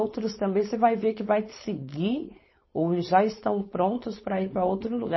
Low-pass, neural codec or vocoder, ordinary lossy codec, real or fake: 7.2 kHz; codec, 16 kHz, 4.8 kbps, FACodec; MP3, 24 kbps; fake